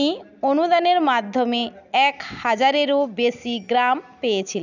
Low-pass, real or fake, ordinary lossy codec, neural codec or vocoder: 7.2 kHz; real; none; none